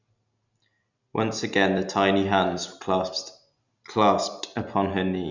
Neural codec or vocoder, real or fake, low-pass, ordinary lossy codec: none; real; 7.2 kHz; none